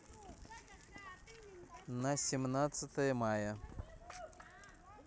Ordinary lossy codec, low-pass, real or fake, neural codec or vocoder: none; none; real; none